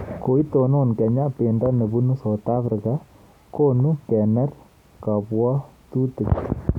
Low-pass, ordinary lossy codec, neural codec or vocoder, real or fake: 19.8 kHz; none; none; real